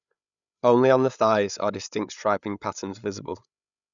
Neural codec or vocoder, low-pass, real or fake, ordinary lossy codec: codec, 16 kHz, 8 kbps, FreqCodec, larger model; 7.2 kHz; fake; none